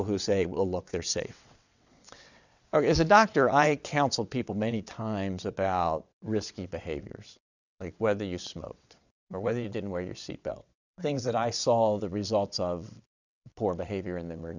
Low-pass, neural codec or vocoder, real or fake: 7.2 kHz; vocoder, 22.05 kHz, 80 mel bands, WaveNeXt; fake